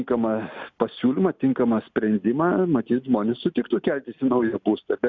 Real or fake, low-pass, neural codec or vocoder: real; 7.2 kHz; none